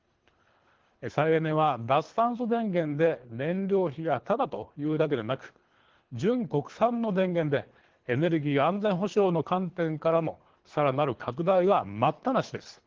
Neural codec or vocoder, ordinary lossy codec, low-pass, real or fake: codec, 24 kHz, 3 kbps, HILCodec; Opus, 16 kbps; 7.2 kHz; fake